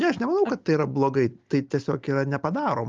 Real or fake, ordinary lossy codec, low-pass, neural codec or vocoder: real; Opus, 32 kbps; 7.2 kHz; none